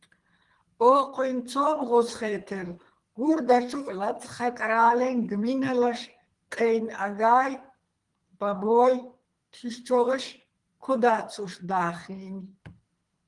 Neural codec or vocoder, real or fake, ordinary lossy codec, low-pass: codec, 24 kHz, 3 kbps, HILCodec; fake; Opus, 24 kbps; 10.8 kHz